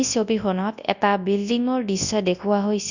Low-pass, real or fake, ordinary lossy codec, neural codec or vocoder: 7.2 kHz; fake; none; codec, 24 kHz, 0.9 kbps, WavTokenizer, large speech release